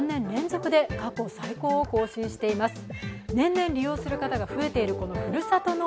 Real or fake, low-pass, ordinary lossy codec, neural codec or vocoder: real; none; none; none